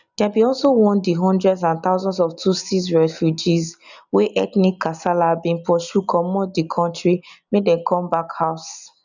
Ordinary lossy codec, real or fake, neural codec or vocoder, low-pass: none; real; none; 7.2 kHz